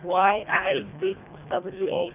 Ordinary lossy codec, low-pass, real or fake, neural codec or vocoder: none; 3.6 kHz; fake; codec, 24 kHz, 1.5 kbps, HILCodec